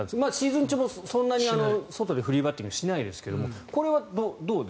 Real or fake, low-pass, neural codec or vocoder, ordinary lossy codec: real; none; none; none